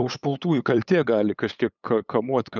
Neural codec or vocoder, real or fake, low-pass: codec, 16 kHz, 8 kbps, FunCodec, trained on LibriTTS, 25 frames a second; fake; 7.2 kHz